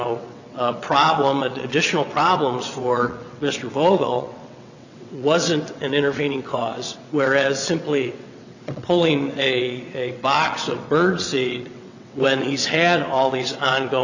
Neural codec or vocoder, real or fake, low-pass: vocoder, 22.05 kHz, 80 mel bands, WaveNeXt; fake; 7.2 kHz